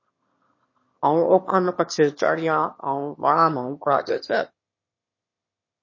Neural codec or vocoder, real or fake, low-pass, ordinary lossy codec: autoencoder, 22.05 kHz, a latent of 192 numbers a frame, VITS, trained on one speaker; fake; 7.2 kHz; MP3, 32 kbps